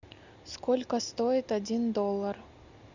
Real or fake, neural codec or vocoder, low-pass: real; none; 7.2 kHz